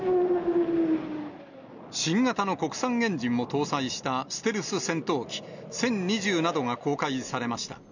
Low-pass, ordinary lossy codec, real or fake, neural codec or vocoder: 7.2 kHz; none; real; none